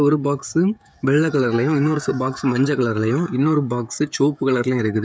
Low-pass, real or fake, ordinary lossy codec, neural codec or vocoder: none; fake; none; codec, 16 kHz, 16 kbps, FreqCodec, smaller model